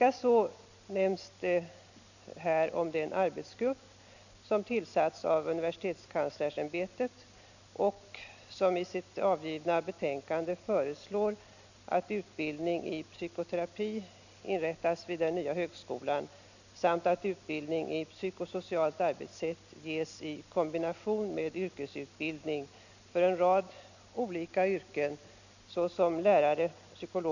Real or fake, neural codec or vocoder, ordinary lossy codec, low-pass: real; none; none; 7.2 kHz